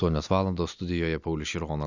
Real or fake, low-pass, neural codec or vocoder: real; 7.2 kHz; none